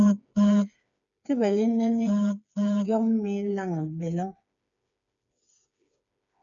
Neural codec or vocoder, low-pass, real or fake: codec, 16 kHz, 4 kbps, FreqCodec, smaller model; 7.2 kHz; fake